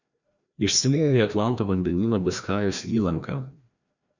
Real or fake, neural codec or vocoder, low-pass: fake; codec, 16 kHz, 1 kbps, FreqCodec, larger model; 7.2 kHz